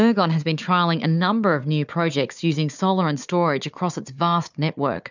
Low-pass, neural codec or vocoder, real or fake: 7.2 kHz; codec, 16 kHz, 4 kbps, FunCodec, trained on Chinese and English, 50 frames a second; fake